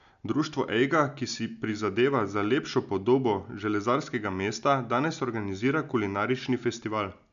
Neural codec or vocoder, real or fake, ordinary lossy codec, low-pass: none; real; MP3, 96 kbps; 7.2 kHz